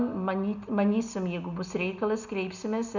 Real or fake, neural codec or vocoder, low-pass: real; none; 7.2 kHz